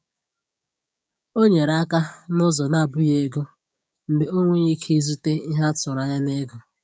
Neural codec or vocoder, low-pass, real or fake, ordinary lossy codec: codec, 16 kHz, 6 kbps, DAC; none; fake; none